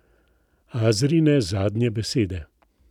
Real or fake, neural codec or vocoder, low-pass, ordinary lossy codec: fake; vocoder, 44.1 kHz, 128 mel bands every 256 samples, BigVGAN v2; 19.8 kHz; none